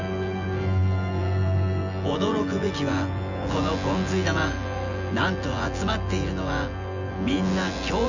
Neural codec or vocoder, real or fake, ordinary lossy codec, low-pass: vocoder, 24 kHz, 100 mel bands, Vocos; fake; none; 7.2 kHz